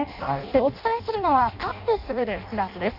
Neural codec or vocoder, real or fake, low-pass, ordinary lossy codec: codec, 16 kHz in and 24 kHz out, 0.6 kbps, FireRedTTS-2 codec; fake; 5.4 kHz; none